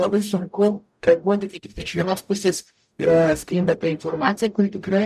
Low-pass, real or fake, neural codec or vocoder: 14.4 kHz; fake; codec, 44.1 kHz, 0.9 kbps, DAC